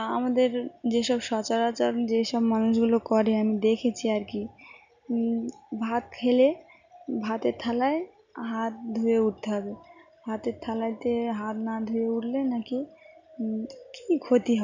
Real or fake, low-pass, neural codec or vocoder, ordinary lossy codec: real; 7.2 kHz; none; none